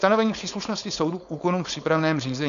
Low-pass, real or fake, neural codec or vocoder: 7.2 kHz; fake; codec, 16 kHz, 4.8 kbps, FACodec